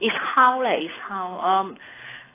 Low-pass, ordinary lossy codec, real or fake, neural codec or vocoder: 3.6 kHz; AAC, 16 kbps; fake; codec, 24 kHz, 6 kbps, HILCodec